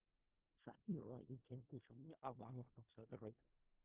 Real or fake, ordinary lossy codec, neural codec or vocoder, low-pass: fake; Opus, 16 kbps; codec, 16 kHz in and 24 kHz out, 0.4 kbps, LongCat-Audio-Codec, four codebook decoder; 3.6 kHz